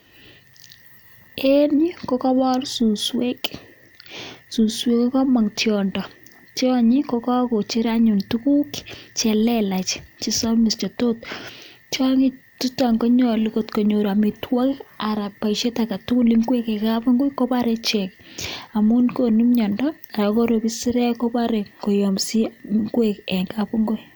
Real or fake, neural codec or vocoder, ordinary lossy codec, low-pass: real; none; none; none